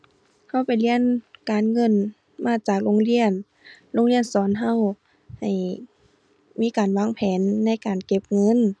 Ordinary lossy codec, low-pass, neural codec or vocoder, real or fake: none; none; none; real